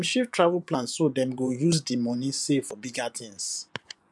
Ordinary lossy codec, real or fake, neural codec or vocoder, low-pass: none; fake; vocoder, 24 kHz, 100 mel bands, Vocos; none